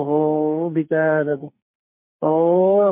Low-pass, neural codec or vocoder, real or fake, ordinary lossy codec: 3.6 kHz; codec, 44.1 kHz, 2.6 kbps, SNAC; fake; MP3, 32 kbps